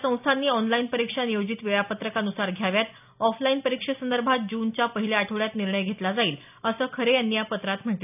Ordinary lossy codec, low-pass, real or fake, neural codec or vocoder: none; 3.6 kHz; real; none